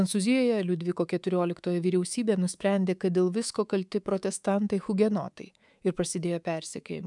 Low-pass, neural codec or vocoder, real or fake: 10.8 kHz; codec, 24 kHz, 3.1 kbps, DualCodec; fake